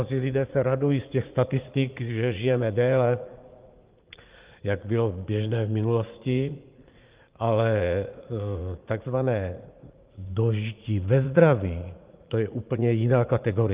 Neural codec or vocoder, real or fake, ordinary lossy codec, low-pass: vocoder, 44.1 kHz, 80 mel bands, Vocos; fake; Opus, 32 kbps; 3.6 kHz